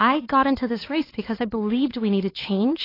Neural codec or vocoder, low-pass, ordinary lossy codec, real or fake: codec, 16 kHz, 4 kbps, X-Codec, HuBERT features, trained on LibriSpeech; 5.4 kHz; AAC, 24 kbps; fake